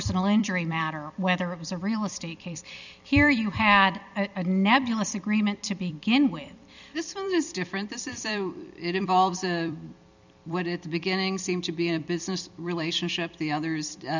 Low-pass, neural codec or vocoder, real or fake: 7.2 kHz; vocoder, 44.1 kHz, 128 mel bands every 256 samples, BigVGAN v2; fake